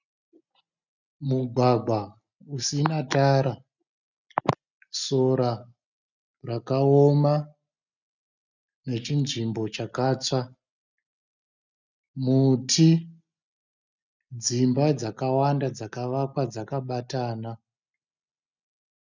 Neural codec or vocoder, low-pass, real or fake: none; 7.2 kHz; real